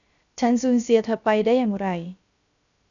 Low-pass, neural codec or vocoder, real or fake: 7.2 kHz; codec, 16 kHz, 0.3 kbps, FocalCodec; fake